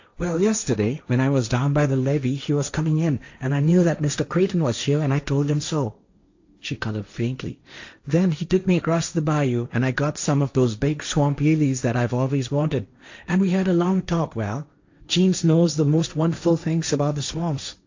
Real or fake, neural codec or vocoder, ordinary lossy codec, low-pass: fake; codec, 16 kHz, 1.1 kbps, Voila-Tokenizer; AAC, 48 kbps; 7.2 kHz